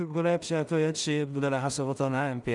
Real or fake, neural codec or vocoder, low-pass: fake; codec, 16 kHz in and 24 kHz out, 0.4 kbps, LongCat-Audio-Codec, two codebook decoder; 10.8 kHz